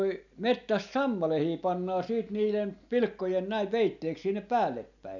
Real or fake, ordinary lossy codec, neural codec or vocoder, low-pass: real; none; none; 7.2 kHz